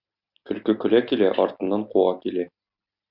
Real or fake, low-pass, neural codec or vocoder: real; 5.4 kHz; none